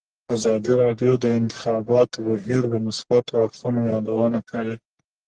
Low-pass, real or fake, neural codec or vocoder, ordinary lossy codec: 9.9 kHz; fake; codec, 44.1 kHz, 1.7 kbps, Pupu-Codec; Opus, 16 kbps